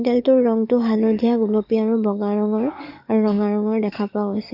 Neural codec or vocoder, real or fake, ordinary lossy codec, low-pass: codec, 44.1 kHz, 7.8 kbps, DAC; fake; AAC, 48 kbps; 5.4 kHz